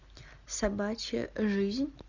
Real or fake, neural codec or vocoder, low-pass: real; none; 7.2 kHz